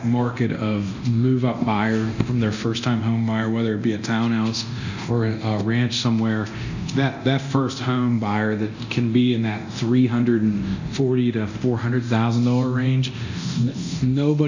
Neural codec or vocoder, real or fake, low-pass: codec, 24 kHz, 0.9 kbps, DualCodec; fake; 7.2 kHz